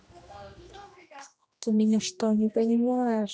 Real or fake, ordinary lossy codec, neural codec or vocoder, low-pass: fake; none; codec, 16 kHz, 1 kbps, X-Codec, HuBERT features, trained on general audio; none